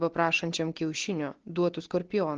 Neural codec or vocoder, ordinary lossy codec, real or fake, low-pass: none; Opus, 16 kbps; real; 7.2 kHz